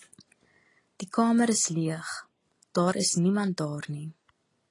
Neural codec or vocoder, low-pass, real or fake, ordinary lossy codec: none; 10.8 kHz; real; AAC, 32 kbps